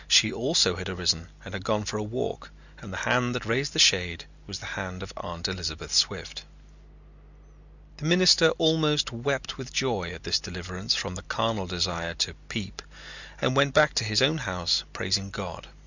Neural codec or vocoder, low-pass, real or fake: none; 7.2 kHz; real